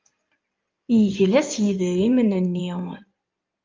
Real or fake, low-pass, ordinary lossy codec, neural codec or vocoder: fake; 7.2 kHz; Opus, 24 kbps; codec, 16 kHz in and 24 kHz out, 2.2 kbps, FireRedTTS-2 codec